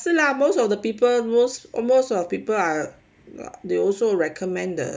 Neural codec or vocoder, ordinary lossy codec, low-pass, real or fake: none; none; none; real